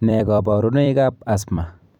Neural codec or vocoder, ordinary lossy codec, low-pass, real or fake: vocoder, 44.1 kHz, 128 mel bands every 256 samples, BigVGAN v2; none; 19.8 kHz; fake